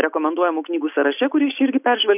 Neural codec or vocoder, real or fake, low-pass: none; real; 3.6 kHz